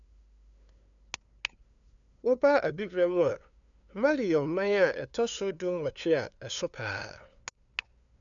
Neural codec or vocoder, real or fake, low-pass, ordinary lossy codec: codec, 16 kHz, 2 kbps, FunCodec, trained on LibriTTS, 25 frames a second; fake; 7.2 kHz; none